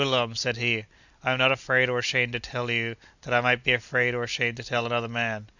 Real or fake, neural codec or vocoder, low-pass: real; none; 7.2 kHz